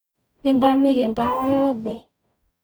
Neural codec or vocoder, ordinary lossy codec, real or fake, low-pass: codec, 44.1 kHz, 0.9 kbps, DAC; none; fake; none